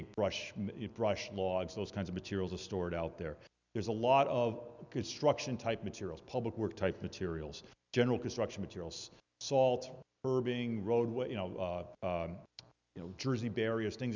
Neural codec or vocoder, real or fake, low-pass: none; real; 7.2 kHz